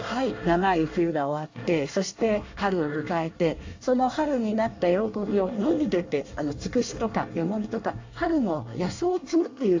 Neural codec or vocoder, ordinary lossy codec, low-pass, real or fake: codec, 24 kHz, 1 kbps, SNAC; AAC, 48 kbps; 7.2 kHz; fake